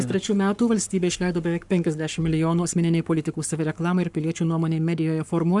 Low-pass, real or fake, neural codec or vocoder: 10.8 kHz; fake; codec, 44.1 kHz, 7.8 kbps, Pupu-Codec